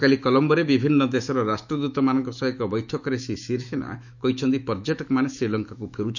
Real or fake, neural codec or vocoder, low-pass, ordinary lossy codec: fake; autoencoder, 48 kHz, 128 numbers a frame, DAC-VAE, trained on Japanese speech; 7.2 kHz; none